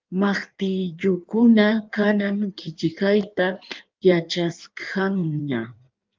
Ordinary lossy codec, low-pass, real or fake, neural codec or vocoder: Opus, 24 kbps; 7.2 kHz; fake; codec, 16 kHz in and 24 kHz out, 1.1 kbps, FireRedTTS-2 codec